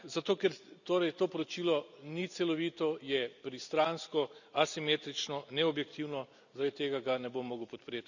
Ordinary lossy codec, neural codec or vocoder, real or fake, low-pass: none; none; real; 7.2 kHz